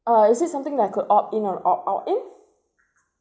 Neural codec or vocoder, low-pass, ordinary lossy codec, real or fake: none; none; none; real